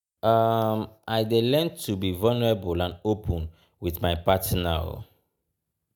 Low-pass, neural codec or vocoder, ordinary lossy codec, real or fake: none; none; none; real